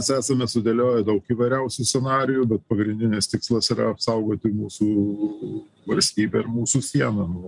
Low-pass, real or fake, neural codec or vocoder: 10.8 kHz; real; none